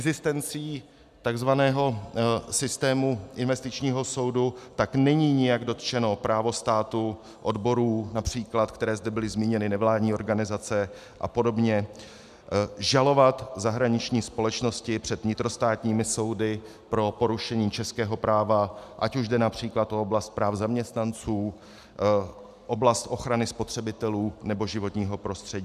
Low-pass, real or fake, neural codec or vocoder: 14.4 kHz; real; none